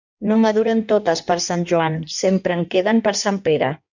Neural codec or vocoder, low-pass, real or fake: codec, 16 kHz in and 24 kHz out, 1.1 kbps, FireRedTTS-2 codec; 7.2 kHz; fake